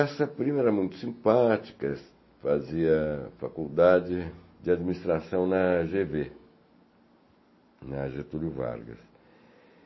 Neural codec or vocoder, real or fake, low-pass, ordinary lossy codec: none; real; 7.2 kHz; MP3, 24 kbps